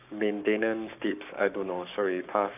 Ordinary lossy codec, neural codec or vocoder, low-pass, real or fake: none; codec, 44.1 kHz, 7.8 kbps, Pupu-Codec; 3.6 kHz; fake